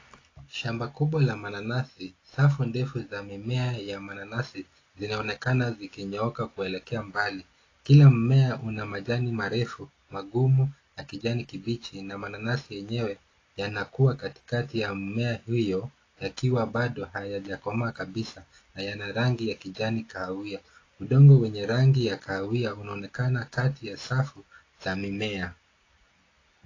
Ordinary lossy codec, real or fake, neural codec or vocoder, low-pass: AAC, 32 kbps; real; none; 7.2 kHz